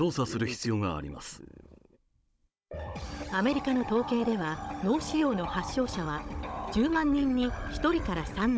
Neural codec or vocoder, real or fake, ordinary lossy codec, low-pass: codec, 16 kHz, 16 kbps, FunCodec, trained on Chinese and English, 50 frames a second; fake; none; none